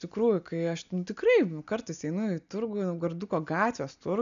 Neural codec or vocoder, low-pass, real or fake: none; 7.2 kHz; real